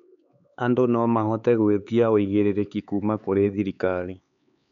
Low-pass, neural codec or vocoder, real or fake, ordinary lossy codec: 7.2 kHz; codec, 16 kHz, 4 kbps, X-Codec, HuBERT features, trained on LibriSpeech; fake; none